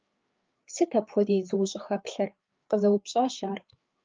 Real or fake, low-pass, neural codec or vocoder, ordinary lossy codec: fake; 7.2 kHz; codec, 16 kHz, 4 kbps, FreqCodec, larger model; Opus, 24 kbps